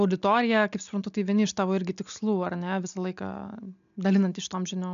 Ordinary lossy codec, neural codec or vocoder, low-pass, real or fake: AAC, 96 kbps; none; 7.2 kHz; real